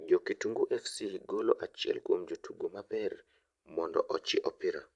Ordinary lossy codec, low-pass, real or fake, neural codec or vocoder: AAC, 64 kbps; 10.8 kHz; fake; vocoder, 44.1 kHz, 128 mel bands every 512 samples, BigVGAN v2